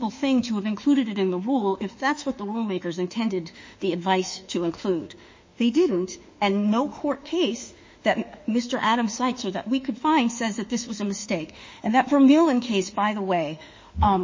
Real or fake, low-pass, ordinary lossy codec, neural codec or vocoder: fake; 7.2 kHz; MP3, 32 kbps; autoencoder, 48 kHz, 32 numbers a frame, DAC-VAE, trained on Japanese speech